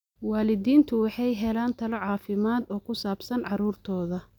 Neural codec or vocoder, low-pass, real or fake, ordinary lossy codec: none; 19.8 kHz; real; none